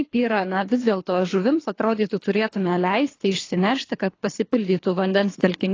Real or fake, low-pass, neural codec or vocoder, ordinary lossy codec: fake; 7.2 kHz; codec, 24 kHz, 3 kbps, HILCodec; AAC, 32 kbps